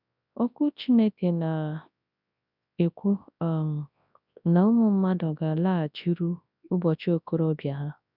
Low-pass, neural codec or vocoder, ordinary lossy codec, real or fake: 5.4 kHz; codec, 24 kHz, 0.9 kbps, WavTokenizer, large speech release; none; fake